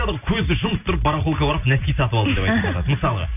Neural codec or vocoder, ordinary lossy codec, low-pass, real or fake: none; none; 3.6 kHz; real